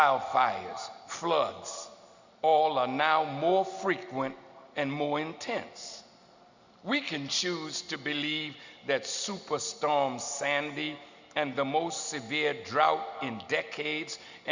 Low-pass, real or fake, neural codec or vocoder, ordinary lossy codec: 7.2 kHz; real; none; Opus, 64 kbps